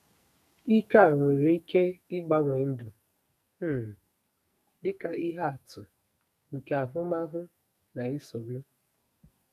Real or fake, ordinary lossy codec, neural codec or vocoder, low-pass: fake; none; codec, 44.1 kHz, 2.6 kbps, SNAC; 14.4 kHz